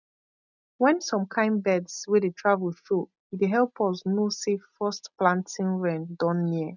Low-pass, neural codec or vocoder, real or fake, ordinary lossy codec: 7.2 kHz; none; real; none